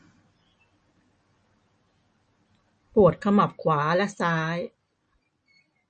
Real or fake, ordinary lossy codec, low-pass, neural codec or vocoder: real; MP3, 32 kbps; 10.8 kHz; none